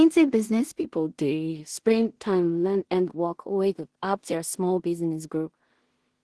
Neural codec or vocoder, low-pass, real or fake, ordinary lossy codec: codec, 16 kHz in and 24 kHz out, 0.4 kbps, LongCat-Audio-Codec, two codebook decoder; 10.8 kHz; fake; Opus, 16 kbps